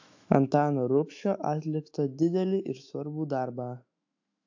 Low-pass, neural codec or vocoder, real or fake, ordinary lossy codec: 7.2 kHz; autoencoder, 48 kHz, 128 numbers a frame, DAC-VAE, trained on Japanese speech; fake; AAC, 48 kbps